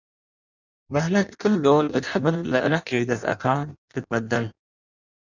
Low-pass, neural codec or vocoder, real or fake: 7.2 kHz; codec, 16 kHz in and 24 kHz out, 0.6 kbps, FireRedTTS-2 codec; fake